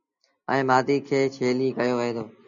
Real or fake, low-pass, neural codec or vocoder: real; 7.2 kHz; none